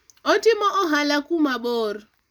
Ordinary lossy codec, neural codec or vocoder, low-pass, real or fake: none; none; none; real